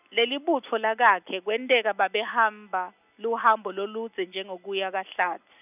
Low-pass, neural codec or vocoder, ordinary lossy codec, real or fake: 3.6 kHz; none; none; real